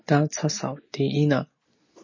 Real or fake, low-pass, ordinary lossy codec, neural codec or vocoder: real; 7.2 kHz; MP3, 32 kbps; none